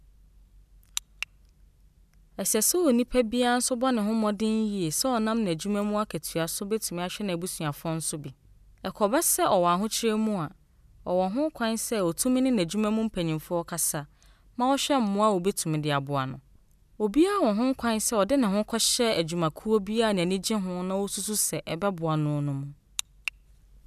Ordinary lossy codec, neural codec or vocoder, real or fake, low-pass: none; none; real; 14.4 kHz